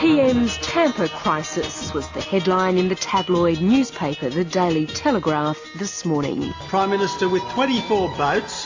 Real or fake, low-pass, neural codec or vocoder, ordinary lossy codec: real; 7.2 kHz; none; AAC, 48 kbps